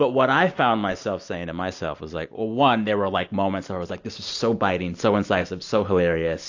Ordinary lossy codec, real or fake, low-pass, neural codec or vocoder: AAC, 48 kbps; real; 7.2 kHz; none